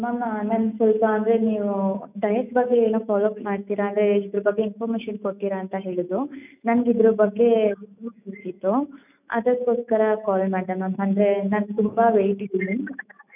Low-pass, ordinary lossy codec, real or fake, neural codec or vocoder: 3.6 kHz; none; fake; autoencoder, 48 kHz, 128 numbers a frame, DAC-VAE, trained on Japanese speech